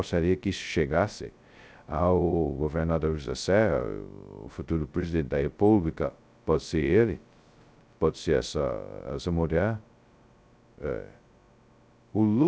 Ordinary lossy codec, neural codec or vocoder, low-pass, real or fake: none; codec, 16 kHz, 0.2 kbps, FocalCodec; none; fake